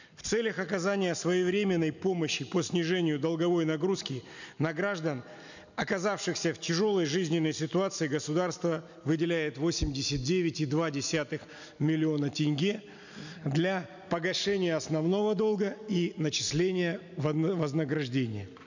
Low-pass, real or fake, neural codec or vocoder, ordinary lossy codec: 7.2 kHz; real; none; none